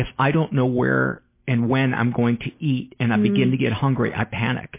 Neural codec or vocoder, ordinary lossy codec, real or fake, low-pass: none; MP3, 24 kbps; real; 3.6 kHz